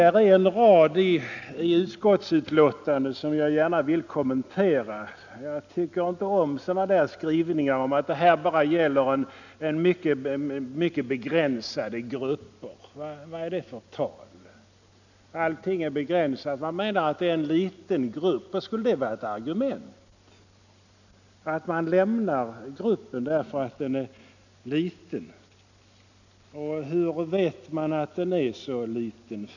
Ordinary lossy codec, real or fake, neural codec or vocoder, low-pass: none; real; none; 7.2 kHz